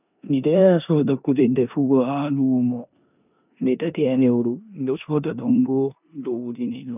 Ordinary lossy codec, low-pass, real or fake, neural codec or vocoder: none; 3.6 kHz; fake; codec, 16 kHz in and 24 kHz out, 0.9 kbps, LongCat-Audio-Codec, four codebook decoder